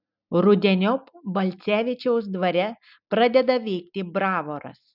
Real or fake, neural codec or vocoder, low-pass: real; none; 5.4 kHz